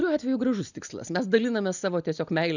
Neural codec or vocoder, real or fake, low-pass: none; real; 7.2 kHz